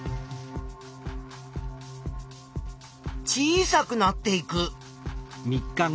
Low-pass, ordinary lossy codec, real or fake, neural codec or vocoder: none; none; real; none